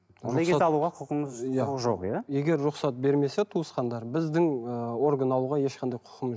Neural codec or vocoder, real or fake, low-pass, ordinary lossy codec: none; real; none; none